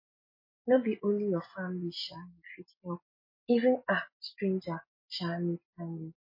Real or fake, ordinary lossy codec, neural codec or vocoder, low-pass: real; MP3, 24 kbps; none; 5.4 kHz